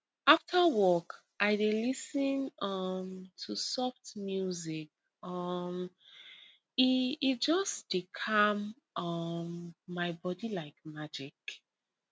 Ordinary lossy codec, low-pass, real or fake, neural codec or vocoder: none; none; real; none